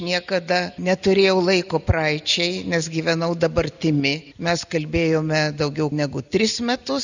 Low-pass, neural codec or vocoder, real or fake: 7.2 kHz; none; real